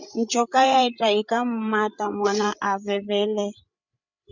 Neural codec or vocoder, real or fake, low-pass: codec, 16 kHz, 8 kbps, FreqCodec, larger model; fake; 7.2 kHz